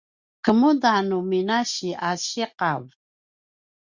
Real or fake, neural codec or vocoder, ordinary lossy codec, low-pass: real; none; Opus, 64 kbps; 7.2 kHz